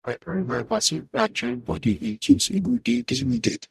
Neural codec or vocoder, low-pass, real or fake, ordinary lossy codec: codec, 44.1 kHz, 0.9 kbps, DAC; 14.4 kHz; fake; none